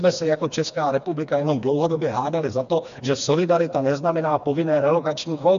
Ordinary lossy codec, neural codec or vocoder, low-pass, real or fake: AAC, 96 kbps; codec, 16 kHz, 2 kbps, FreqCodec, smaller model; 7.2 kHz; fake